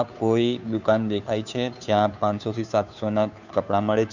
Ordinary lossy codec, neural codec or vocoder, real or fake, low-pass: none; codec, 16 kHz, 2 kbps, FunCodec, trained on Chinese and English, 25 frames a second; fake; 7.2 kHz